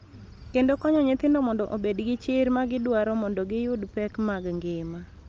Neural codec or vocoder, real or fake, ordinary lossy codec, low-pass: none; real; Opus, 24 kbps; 7.2 kHz